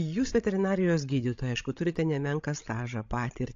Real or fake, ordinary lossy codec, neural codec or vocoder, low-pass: fake; AAC, 48 kbps; codec, 16 kHz, 16 kbps, FreqCodec, larger model; 7.2 kHz